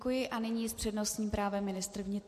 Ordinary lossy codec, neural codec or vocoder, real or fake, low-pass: MP3, 64 kbps; none; real; 14.4 kHz